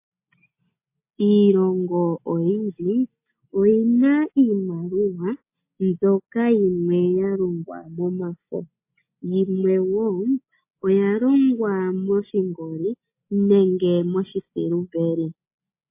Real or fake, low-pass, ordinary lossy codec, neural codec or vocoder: real; 3.6 kHz; MP3, 24 kbps; none